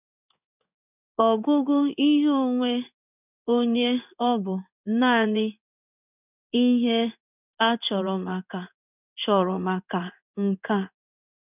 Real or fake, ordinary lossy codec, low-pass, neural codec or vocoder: fake; none; 3.6 kHz; codec, 16 kHz in and 24 kHz out, 1 kbps, XY-Tokenizer